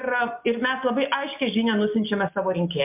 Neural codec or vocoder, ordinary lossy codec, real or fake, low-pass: none; MP3, 32 kbps; real; 3.6 kHz